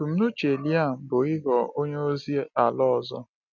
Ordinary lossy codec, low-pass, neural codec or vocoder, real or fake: none; 7.2 kHz; none; real